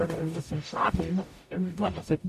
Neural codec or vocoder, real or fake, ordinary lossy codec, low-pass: codec, 44.1 kHz, 0.9 kbps, DAC; fake; MP3, 96 kbps; 14.4 kHz